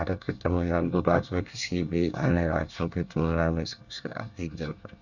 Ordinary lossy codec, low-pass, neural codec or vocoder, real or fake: none; 7.2 kHz; codec, 24 kHz, 1 kbps, SNAC; fake